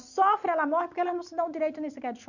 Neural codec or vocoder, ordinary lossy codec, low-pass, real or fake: none; none; 7.2 kHz; real